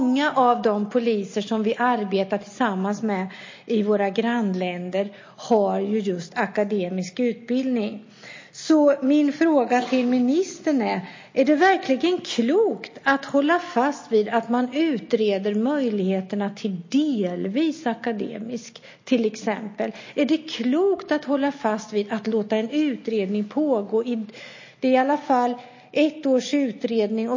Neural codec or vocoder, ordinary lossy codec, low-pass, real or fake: none; MP3, 32 kbps; 7.2 kHz; real